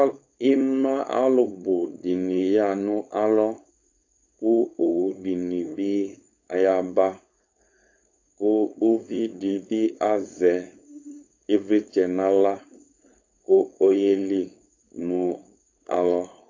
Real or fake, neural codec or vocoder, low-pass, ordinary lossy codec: fake; codec, 16 kHz, 4.8 kbps, FACodec; 7.2 kHz; AAC, 48 kbps